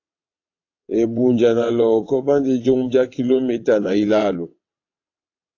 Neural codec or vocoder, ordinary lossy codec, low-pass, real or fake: vocoder, 22.05 kHz, 80 mel bands, WaveNeXt; AAC, 48 kbps; 7.2 kHz; fake